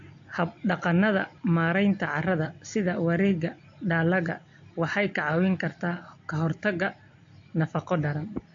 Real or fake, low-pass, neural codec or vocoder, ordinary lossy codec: real; 7.2 kHz; none; AAC, 48 kbps